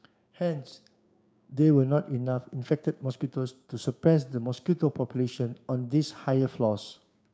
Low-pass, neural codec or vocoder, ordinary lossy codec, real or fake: none; codec, 16 kHz, 6 kbps, DAC; none; fake